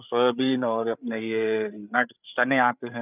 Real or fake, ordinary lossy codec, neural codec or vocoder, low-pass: fake; none; codec, 16 kHz, 8 kbps, FreqCodec, larger model; 3.6 kHz